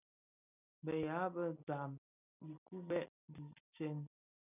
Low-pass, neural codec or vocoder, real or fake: 3.6 kHz; none; real